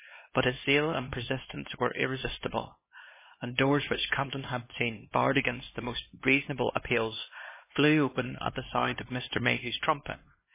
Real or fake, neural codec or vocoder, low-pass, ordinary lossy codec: fake; codec, 16 kHz, 2 kbps, X-Codec, HuBERT features, trained on LibriSpeech; 3.6 kHz; MP3, 16 kbps